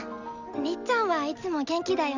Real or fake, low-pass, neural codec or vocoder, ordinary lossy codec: real; 7.2 kHz; none; none